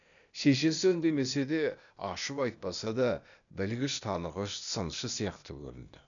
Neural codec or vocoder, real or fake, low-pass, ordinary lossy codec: codec, 16 kHz, 0.8 kbps, ZipCodec; fake; 7.2 kHz; none